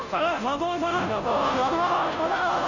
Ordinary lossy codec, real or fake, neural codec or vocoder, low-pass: none; fake; codec, 16 kHz, 0.5 kbps, FunCodec, trained on Chinese and English, 25 frames a second; 7.2 kHz